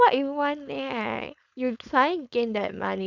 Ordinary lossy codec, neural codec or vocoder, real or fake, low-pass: none; codec, 16 kHz, 4.8 kbps, FACodec; fake; 7.2 kHz